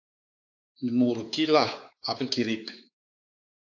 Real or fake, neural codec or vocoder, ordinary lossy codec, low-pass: fake; codec, 16 kHz, 4 kbps, X-Codec, WavLM features, trained on Multilingual LibriSpeech; AAC, 48 kbps; 7.2 kHz